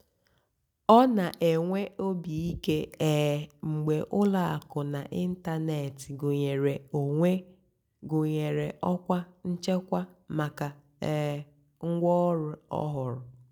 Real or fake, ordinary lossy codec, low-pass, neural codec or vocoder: real; none; none; none